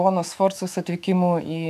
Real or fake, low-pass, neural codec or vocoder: fake; 14.4 kHz; autoencoder, 48 kHz, 128 numbers a frame, DAC-VAE, trained on Japanese speech